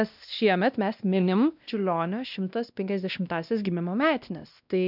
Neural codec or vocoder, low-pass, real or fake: codec, 16 kHz, 1 kbps, X-Codec, WavLM features, trained on Multilingual LibriSpeech; 5.4 kHz; fake